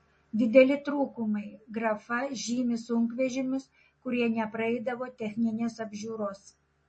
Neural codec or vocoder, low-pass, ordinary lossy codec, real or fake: none; 9.9 kHz; MP3, 32 kbps; real